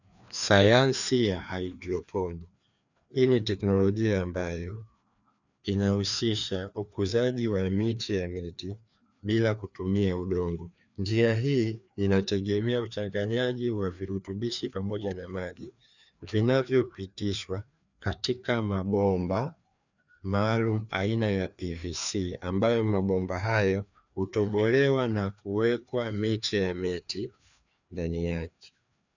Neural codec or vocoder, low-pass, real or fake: codec, 16 kHz, 2 kbps, FreqCodec, larger model; 7.2 kHz; fake